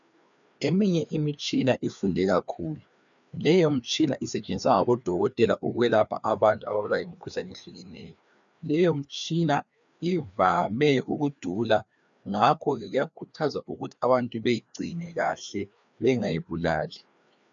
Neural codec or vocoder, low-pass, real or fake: codec, 16 kHz, 2 kbps, FreqCodec, larger model; 7.2 kHz; fake